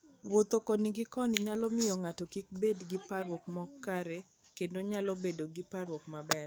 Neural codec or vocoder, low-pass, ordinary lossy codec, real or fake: codec, 44.1 kHz, 7.8 kbps, DAC; none; none; fake